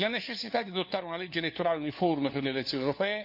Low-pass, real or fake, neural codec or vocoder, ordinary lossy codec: 5.4 kHz; fake; codec, 16 kHz, 4 kbps, FunCodec, trained on LibriTTS, 50 frames a second; none